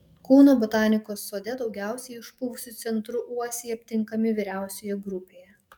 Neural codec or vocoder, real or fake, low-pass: codec, 44.1 kHz, 7.8 kbps, DAC; fake; 19.8 kHz